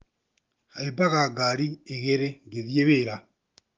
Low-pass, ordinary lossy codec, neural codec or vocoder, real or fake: 7.2 kHz; Opus, 32 kbps; none; real